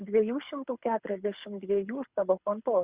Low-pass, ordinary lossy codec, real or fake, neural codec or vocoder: 3.6 kHz; Opus, 64 kbps; fake; codec, 24 kHz, 6 kbps, HILCodec